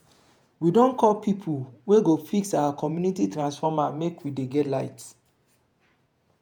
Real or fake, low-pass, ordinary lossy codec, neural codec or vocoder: fake; none; none; vocoder, 48 kHz, 128 mel bands, Vocos